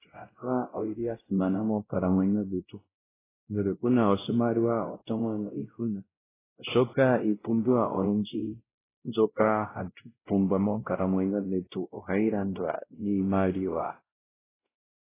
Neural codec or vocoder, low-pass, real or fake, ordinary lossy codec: codec, 16 kHz, 0.5 kbps, X-Codec, WavLM features, trained on Multilingual LibriSpeech; 3.6 kHz; fake; AAC, 16 kbps